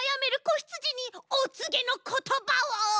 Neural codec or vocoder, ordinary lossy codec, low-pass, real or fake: none; none; none; real